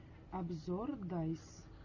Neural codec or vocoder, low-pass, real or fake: none; 7.2 kHz; real